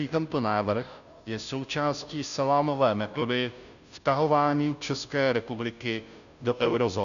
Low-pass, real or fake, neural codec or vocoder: 7.2 kHz; fake; codec, 16 kHz, 0.5 kbps, FunCodec, trained on Chinese and English, 25 frames a second